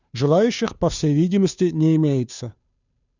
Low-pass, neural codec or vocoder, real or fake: 7.2 kHz; codec, 16 kHz, 2 kbps, FunCodec, trained on Chinese and English, 25 frames a second; fake